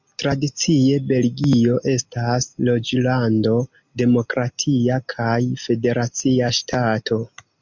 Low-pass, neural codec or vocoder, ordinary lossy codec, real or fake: 7.2 kHz; none; MP3, 64 kbps; real